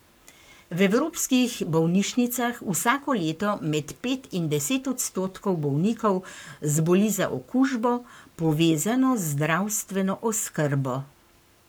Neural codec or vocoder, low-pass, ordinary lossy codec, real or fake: codec, 44.1 kHz, 7.8 kbps, Pupu-Codec; none; none; fake